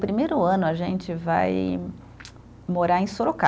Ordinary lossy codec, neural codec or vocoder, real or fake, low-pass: none; none; real; none